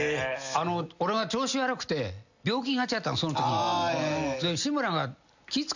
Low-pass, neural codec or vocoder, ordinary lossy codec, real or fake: 7.2 kHz; none; none; real